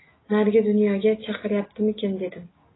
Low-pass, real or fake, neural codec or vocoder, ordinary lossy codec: 7.2 kHz; real; none; AAC, 16 kbps